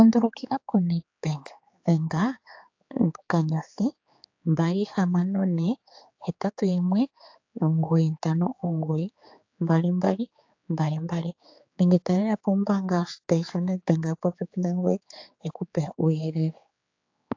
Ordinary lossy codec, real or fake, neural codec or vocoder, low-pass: AAC, 48 kbps; fake; codec, 16 kHz, 4 kbps, X-Codec, HuBERT features, trained on general audio; 7.2 kHz